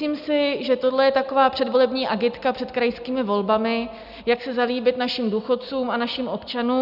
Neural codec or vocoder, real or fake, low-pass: none; real; 5.4 kHz